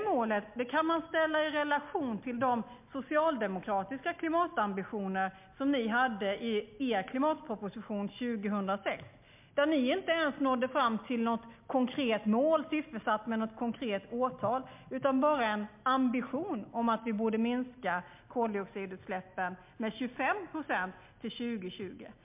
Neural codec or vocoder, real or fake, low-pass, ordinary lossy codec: none; real; 3.6 kHz; MP3, 32 kbps